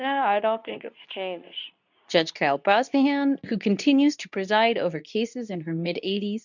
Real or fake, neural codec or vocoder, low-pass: fake; codec, 24 kHz, 0.9 kbps, WavTokenizer, medium speech release version 2; 7.2 kHz